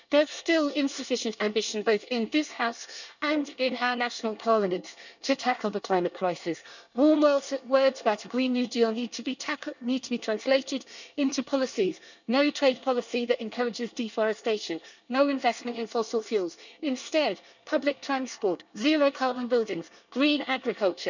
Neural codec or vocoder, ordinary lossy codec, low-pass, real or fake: codec, 24 kHz, 1 kbps, SNAC; none; 7.2 kHz; fake